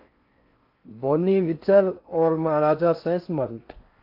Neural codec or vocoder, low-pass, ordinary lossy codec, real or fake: codec, 16 kHz in and 24 kHz out, 0.6 kbps, FocalCodec, streaming, 4096 codes; 5.4 kHz; AAC, 32 kbps; fake